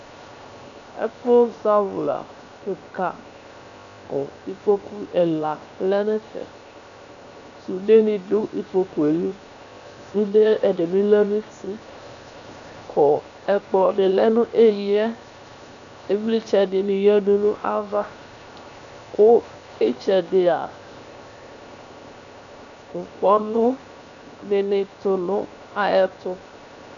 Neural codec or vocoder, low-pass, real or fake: codec, 16 kHz, 0.7 kbps, FocalCodec; 7.2 kHz; fake